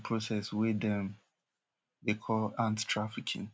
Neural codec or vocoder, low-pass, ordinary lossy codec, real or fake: none; none; none; real